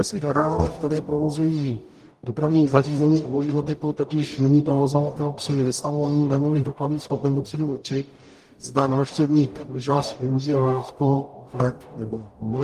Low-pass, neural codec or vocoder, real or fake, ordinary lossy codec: 14.4 kHz; codec, 44.1 kHz, 0.9 kbps, DAC; fake; Opus, 32 kbps